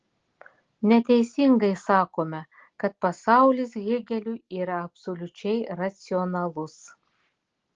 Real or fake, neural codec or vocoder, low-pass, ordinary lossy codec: real; none; 7.2 kHz; Opus, 16 kbps